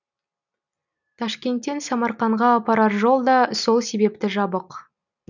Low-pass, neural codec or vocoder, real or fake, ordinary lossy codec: 7.2 kHz; none; real; none